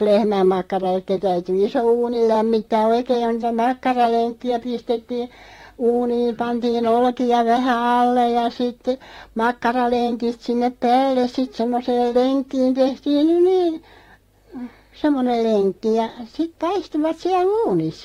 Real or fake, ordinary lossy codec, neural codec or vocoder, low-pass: fake; AAC, 48 kbps; vocoder, 44.1 kHz, 128 mel bands, Pupu-Vocoder; 19.8 kHz